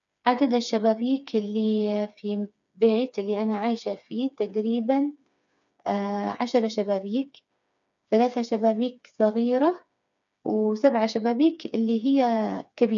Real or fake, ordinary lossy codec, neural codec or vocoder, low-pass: fake; none; codec, 16 kHz, 4 kbps, FreqCodec, smaller model; 7.2 kHz